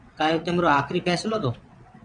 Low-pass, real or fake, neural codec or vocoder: 9.9 kHz; fake; vocoder, 22.05 kHz, 80 mel bands, WaveNeXt